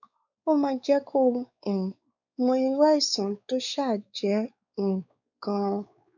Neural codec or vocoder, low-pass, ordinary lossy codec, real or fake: codec, 16 kHz, 4 kbps, X-Codec, WavLM features, trained on Multilingual LibriSpeech; 7.2 kHz; none; fake